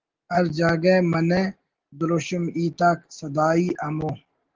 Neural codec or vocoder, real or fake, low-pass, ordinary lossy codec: none; real; 7.2 kHz; Opus, 16 kbps